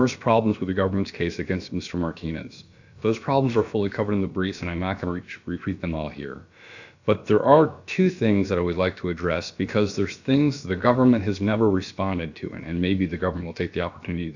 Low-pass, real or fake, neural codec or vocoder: 7.2 kHz; fake; codec, 16 kHz, about 1 kbps, DyCAST, with the encoder's durations